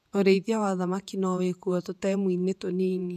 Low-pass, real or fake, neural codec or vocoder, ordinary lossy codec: 14.4 kHz; fake; vocoder, 48 kHz, 128 mel bands, Vocos; none